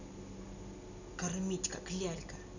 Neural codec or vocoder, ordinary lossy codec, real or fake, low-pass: none; none; real; 7.2 kHz